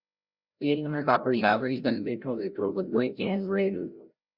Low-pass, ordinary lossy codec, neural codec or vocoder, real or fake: 5.4 kHz; Opus, 64 kbps; codec, 16 kHz, 0.5 kbps, FreqCodec, larger model; fake